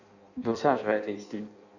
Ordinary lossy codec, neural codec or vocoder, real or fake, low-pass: none; codec, 16 kHz in and 24 kHz out, 0.6 kbps, FireRedTTS-2 codec; fake; 7.2 kHz